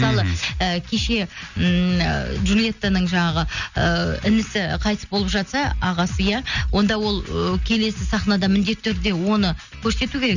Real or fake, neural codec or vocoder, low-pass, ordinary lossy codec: real; none; 7.2 kHz; none